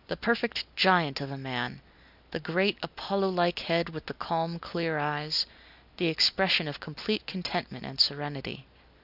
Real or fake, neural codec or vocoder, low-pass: real; none; 5.4 kHz